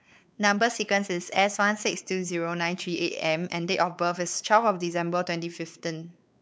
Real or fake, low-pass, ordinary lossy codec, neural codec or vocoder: fake; none; none; codec, 16 kHz, 4 kbps, X-Codec, WavLM features, trained on Multilingual LibriSpeech